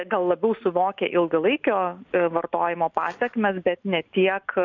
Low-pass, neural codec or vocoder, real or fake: 7.2 kHz; none; real